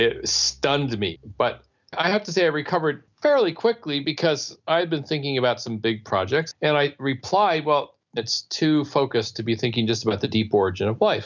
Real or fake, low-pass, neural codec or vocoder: real; 7.2 kHz; none